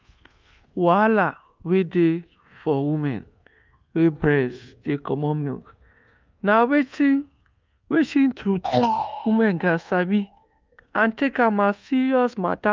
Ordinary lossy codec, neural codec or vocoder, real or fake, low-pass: Opus, 24 kbps; codec, 24 kHz, 1.2 kbps, DualCodec; fake; 7.2 kHz